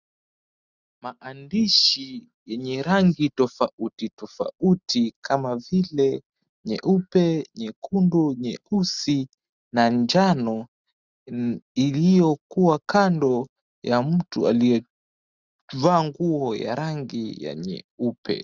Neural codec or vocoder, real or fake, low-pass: none; real; 7.2 kHz